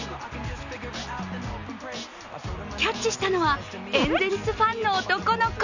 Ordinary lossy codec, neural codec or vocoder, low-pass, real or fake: none; none; 7.2 kHz; real